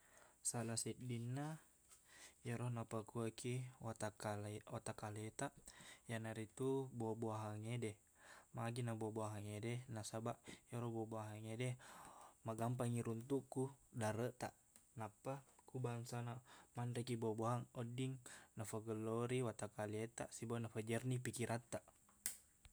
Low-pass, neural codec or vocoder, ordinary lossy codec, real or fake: none; none; none; real